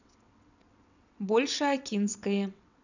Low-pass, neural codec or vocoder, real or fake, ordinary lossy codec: 7.2 kHz; vocoder, 22.05 kHz, 80 mel bands, WaveNeXt; fake; none